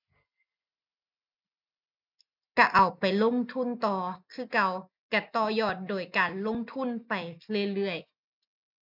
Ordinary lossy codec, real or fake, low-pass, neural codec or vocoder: none; real; 5.4 kHz; none